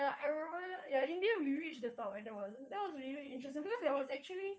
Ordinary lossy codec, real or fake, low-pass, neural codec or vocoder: none; fake; none; codec, 16 kHz, 2 kbps, FunCodec, trained on Chinese and English, 25 frames a second